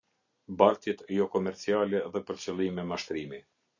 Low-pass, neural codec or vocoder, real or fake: 7.2 kHz; none; real